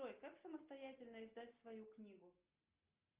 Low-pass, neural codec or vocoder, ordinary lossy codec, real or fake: 3.6 kHz; none; Opus, 32 kbps; real